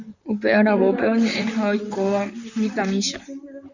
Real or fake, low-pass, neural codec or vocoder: fake; 7.2 kHz; vocoder, 44.1 kHz, 128 mel bands, Pupu-Vocoder